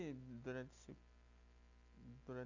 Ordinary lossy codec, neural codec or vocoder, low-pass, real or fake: none; none; 7.2 kHz; real